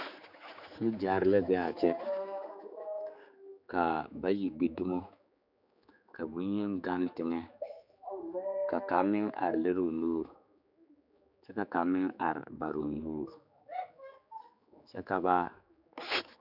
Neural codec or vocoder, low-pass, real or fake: codec, 16 kHz, 4 kbps, X-Codec, HuBERT features, trained on general audio; 5.4 kHz; fake